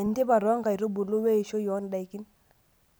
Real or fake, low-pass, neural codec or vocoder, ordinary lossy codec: real; none; none; none